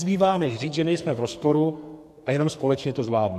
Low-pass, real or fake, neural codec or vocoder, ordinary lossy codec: 14.4 kHz; fake; codec, 44.1 kHz, 2.6 kbps, SNAC; AAC, 96 kbps